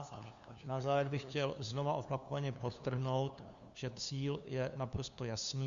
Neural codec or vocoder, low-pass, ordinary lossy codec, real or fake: codec, 16 kHz, 2 kbps, FunCodec, trained on LibriTTS, 25 frames a second; 7.2 kHz; Opus, 64 kbps; fake